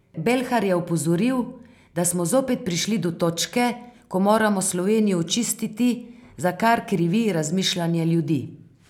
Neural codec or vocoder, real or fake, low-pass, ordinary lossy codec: none; real; 19.8 kHz; none